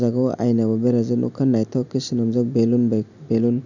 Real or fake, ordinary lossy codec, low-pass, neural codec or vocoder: real; none; 7.2 kHz; none